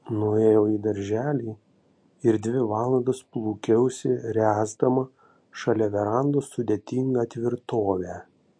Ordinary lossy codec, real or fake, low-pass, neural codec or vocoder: MP3, 48 kbps; real; 9.9 kHz; none